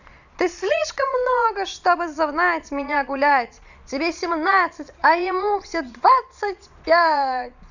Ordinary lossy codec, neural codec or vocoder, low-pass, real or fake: none; vocoder, 22.05 kHz, 80 mel bands, Vocos; 7.2 kHz; fake